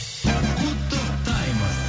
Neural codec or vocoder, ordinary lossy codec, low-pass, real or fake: none; none; none; real